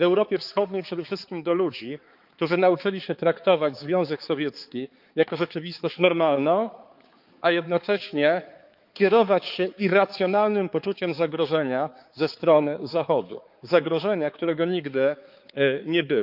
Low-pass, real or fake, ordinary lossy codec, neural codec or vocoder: 5.4 kHz; fake; Opus, 24 kbps; codec, 16 kHz, 4 kbps, X-Codec, HuBERT features, trained on balanced general audio